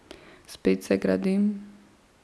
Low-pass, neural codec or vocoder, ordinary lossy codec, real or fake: none; none; none; real